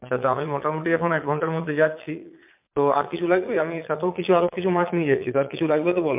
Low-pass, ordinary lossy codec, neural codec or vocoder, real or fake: 3.6 kHz; MP3, 32 kbps; vocoder, 22.05 kHz, 80 mel bands, Vocos; fake